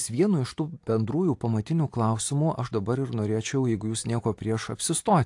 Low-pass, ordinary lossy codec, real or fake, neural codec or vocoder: 10.8 kHz; AAC, 64 kbps; real; none